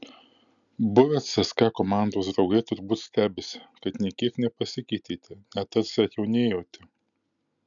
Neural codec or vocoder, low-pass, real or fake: none; 7.2 kHz; real